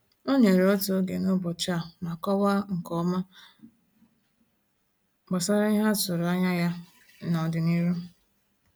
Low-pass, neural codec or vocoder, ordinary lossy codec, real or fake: none; none; none; real